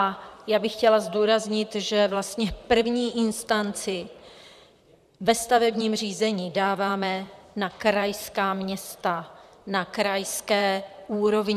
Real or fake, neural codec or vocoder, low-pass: fake; vocoder, 44.1 kHz, 128 mel bands, Pupu-Vocoder; 14.4 kHz